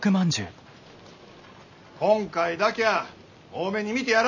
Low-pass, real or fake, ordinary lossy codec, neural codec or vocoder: 7.2 kHz; real; none; none